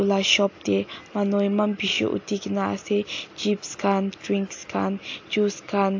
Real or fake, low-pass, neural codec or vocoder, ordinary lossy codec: real; 7.2 kHz; none; AAC, 48 kbps